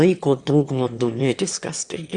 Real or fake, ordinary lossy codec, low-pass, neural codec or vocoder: fake; Opus, 64 kbps; 9.9 kHz; autoencoder, 22.05 kHz, a latent of 192 numbers a frame, VITS, trained on one speaker